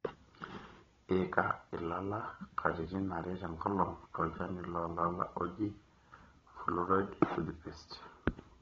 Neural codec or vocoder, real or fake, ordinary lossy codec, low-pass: codec, 16 kHz, 16 kbps, FunCodec, trained on Chinese and English, 50 frames a second; fake; AAC, 24 kbps; 7.2 kHz